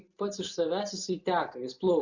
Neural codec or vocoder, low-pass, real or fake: none; 7.2 kHz; real